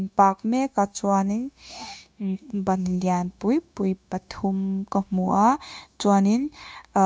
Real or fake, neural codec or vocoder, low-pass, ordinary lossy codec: fake; codec, 16 kHz, 0.9 kbps, LongCat-Audio-Codec; none; none